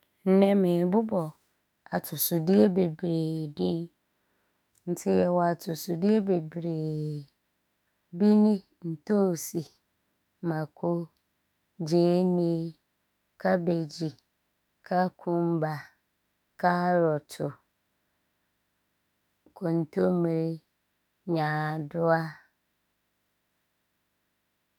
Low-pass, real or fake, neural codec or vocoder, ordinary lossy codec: 19.8 kHz; fake; autoencoder, 48 kHz, 32 numbers a frame, DAC-VAE, trained on Japanese speech; none